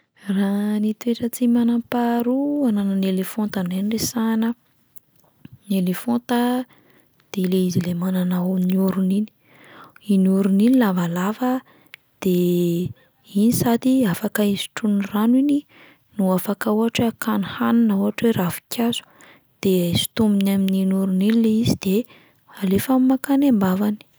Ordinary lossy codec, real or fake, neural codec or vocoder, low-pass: none; real; none; none